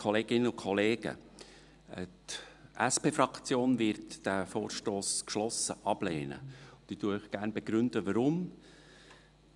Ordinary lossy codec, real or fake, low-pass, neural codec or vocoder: none; real; 10.8 kHz; none